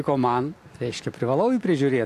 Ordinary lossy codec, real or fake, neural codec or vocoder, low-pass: AAC, 64 kbps; real; none; 14.4 kHz